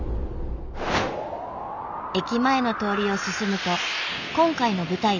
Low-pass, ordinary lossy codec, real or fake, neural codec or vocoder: 7.2 kHz; none; real; none